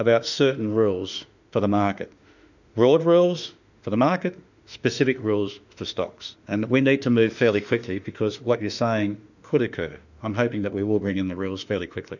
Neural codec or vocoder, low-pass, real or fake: autoencoder, 48 kHz, 32 numbers a frame, DAC-VAE, trained on Japanese speech; 7.2 kHz; fake